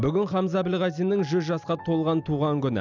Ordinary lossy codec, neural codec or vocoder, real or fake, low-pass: none; none; real; 7.2 kHz